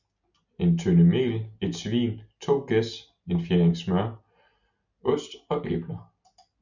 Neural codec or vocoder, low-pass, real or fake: none; 7.2 kHz; real